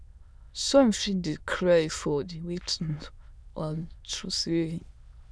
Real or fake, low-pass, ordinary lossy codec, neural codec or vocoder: fake; none; none; autoencoder, 22.05 kHz, a latent of 192 numbers a frame, VITS, trained on many speakers